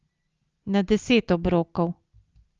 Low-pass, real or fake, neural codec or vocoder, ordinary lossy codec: 7.2 kHz; real; none; Opus, 32 kbps